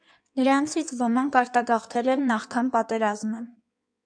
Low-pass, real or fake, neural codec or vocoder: 9.9 kHz; fake; codec, 16 kHz in and 24 kHz out, 1.1 kbps, FireRedTTS-2 codec